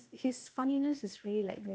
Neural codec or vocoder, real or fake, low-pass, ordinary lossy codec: codec, 16 kHz, 0.8 kbps, ZipCodec; fake; none; none